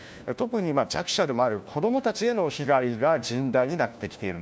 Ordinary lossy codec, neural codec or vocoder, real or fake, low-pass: none; codec, 16 kHz, 1 kbps, FunCodec, trained on LibriTTS, 50 frames a second; fake; none